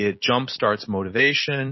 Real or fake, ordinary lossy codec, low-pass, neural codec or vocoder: real; MP3, 24 kbps; 7.2 kHz; none